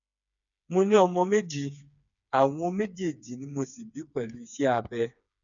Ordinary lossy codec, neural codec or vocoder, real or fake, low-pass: none; codec, 16 kHz, 4 kbps, FreqCodec, smaller model; fake; 7.2 kHz